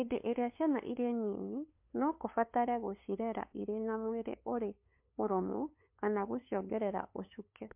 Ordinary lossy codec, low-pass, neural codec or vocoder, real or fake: MP3, 32 kbps; 3.6 kHz; codec, 16 kHz, 2 kbps, FunCodec, trained on LibriTTS, 25 frames a second; fake